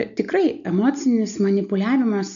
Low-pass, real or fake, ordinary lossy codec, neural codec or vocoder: 7.2 kHz; real; MP3, 96 kbps; none